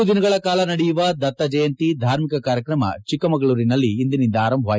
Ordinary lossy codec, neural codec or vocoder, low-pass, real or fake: none; none; none; real